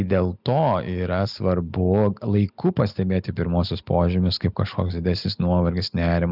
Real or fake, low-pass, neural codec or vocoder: real; 5.4 kHz; none